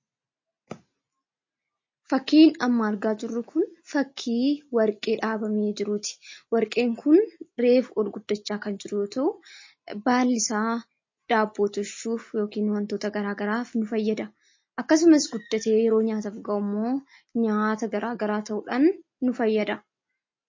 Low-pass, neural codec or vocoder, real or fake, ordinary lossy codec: 7.2 kHz; none; real; MP3, 32 kbps